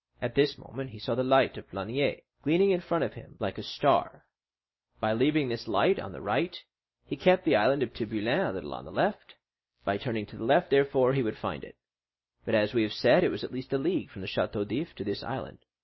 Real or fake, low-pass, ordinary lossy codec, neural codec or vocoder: real; 7.2 kHz; MP3, 24 kbps; none